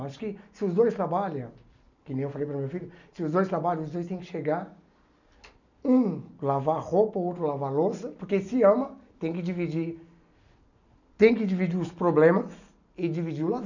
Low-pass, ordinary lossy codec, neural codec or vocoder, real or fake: 7.2 kHz; none; none; real